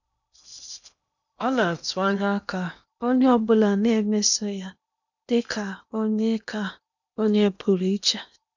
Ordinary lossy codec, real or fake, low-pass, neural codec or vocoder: none; fake; 7.2 kHz; codec, 16 kHz in and 24 kHz out, 0.8 kbps, FocalCodec, streaming, 65536 codes